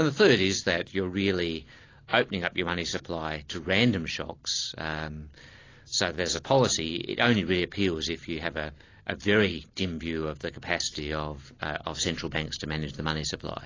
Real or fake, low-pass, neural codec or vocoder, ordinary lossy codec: real; 7.2 kHz; none; AAC, 32 kbps